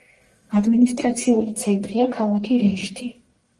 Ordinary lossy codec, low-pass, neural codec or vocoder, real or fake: Opus, 24 kbps; 10.8 kHz; codec, 44.1 kHz, 1.7 kbps, Pupu-Codec; fake